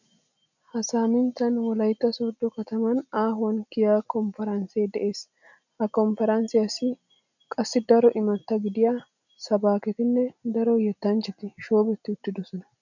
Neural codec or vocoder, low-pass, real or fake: none; 7.2 kHz; real